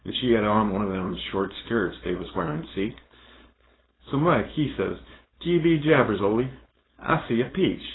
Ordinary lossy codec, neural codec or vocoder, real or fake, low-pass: AAC, 16 kbps; codec, 16 kHz, 4.8 kbps, FACodec; fake; 7.2 kHz